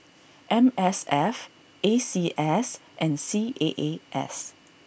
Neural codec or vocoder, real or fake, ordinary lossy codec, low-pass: none; real; none; none